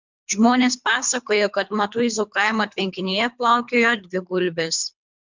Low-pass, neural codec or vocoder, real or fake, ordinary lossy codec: 7.2 kHz; codec, 24 kHz, 3 kbps, HILCodec; fake; MP3, 64 kbps